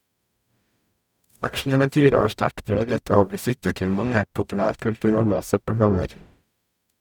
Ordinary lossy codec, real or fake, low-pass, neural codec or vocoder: none; fake; 19.8 kHz; codec, 44.1 kHz, 0.9 kbps, DAC